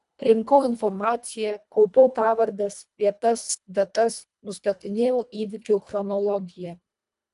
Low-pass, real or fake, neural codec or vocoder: 10.8 kHz; fake; codec, 24 kHz, 1.5 kbps, HILCodec